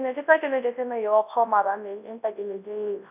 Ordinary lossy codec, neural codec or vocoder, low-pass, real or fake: none; codec, 24 kHz, 0.9 kbps, WavTokenizer, large speech release; 3.6 kHz; fake